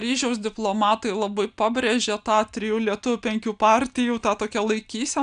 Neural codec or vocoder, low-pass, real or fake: none; 9.9 kHz; real